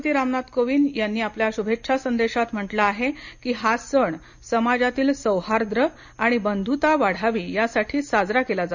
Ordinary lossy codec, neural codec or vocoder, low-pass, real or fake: none; none; 7.2 kHz; real